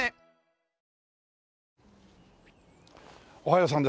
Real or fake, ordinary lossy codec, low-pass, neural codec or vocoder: real; none; none; none